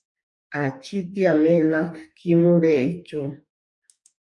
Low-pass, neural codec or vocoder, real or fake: 10.8 kHz; codec, 44.1 kHz, 2.6 kbps, DAC; fake